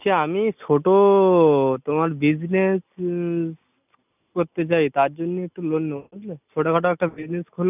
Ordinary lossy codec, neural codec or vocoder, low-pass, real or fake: none; none; 3.6 kHz; real